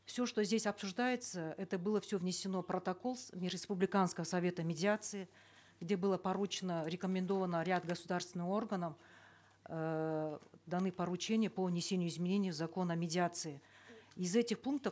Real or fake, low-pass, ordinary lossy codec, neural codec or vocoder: real; none; none; none